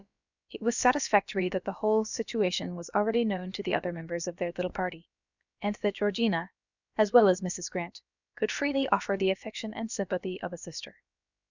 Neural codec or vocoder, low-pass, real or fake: codec, 16 kHz, about 1 kbps, DyCAST, with the encoder's durations; 7.2 kHz; fake